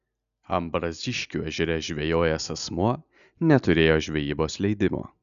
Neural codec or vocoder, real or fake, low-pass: none; real; 7.2 kHz